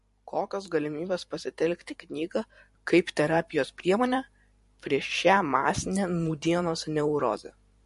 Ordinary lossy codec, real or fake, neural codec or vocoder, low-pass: MP3, 48 kbps; fake; vocoder, 44.1 kHz, 128 mel bands every 512 samples, BigVGAN v2; 14.4 kHz